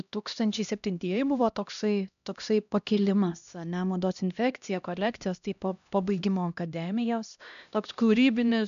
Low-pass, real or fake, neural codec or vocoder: 7.2 kHz; fake; codec, 16 kHz, 1 kbps, X-Codec, HuBERT features, trained on LibriSpeech